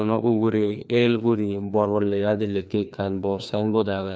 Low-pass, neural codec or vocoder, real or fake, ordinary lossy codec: none; codec, 16 kHz, 2 kbps, FreqCodec, larger model; fake; none